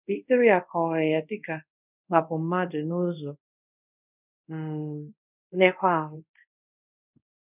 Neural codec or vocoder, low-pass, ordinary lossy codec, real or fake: codec, 24 kHz, 0.5 kbps, DualCodec; 3.6 kHz; none; fake